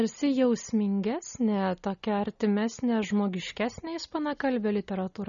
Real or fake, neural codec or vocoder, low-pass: real; none; 7.2 kHz